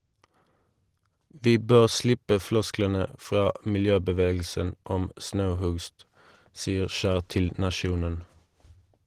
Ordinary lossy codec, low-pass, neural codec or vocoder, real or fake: Opus, 16 kbps; 14.4 kHz; none; real